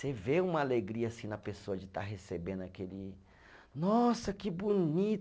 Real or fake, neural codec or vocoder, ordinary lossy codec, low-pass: real; none; none; none